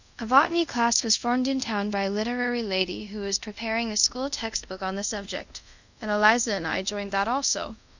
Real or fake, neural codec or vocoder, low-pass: fake; codec, 24 kHz, 0.5 kbps, DualCodec; 7.2 kHz